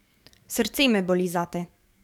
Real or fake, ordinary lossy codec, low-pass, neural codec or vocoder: fake; none; 19.8 kHz; codec, 44.1 kHz, 7.8 kbps, DAC